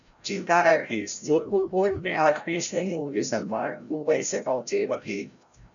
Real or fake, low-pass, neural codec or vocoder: fake; 7.2 kHz; codec, 16 kHz, 0.5 kbps, FreqCodec, larger model